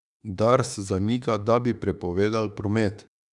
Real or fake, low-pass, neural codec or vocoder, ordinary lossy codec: fake; 10.8 kHz; autoencoder, 48 kHz, 32 numbers a frame, DAC-VAE, trained on Japanese speech; Opus, 64 kbps